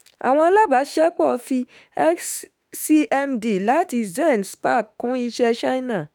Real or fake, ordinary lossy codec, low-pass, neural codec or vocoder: fake; none; none; autoencoder, 48 kHz, 32 numbers a frame, DAC-VAE, trained on Japanese speech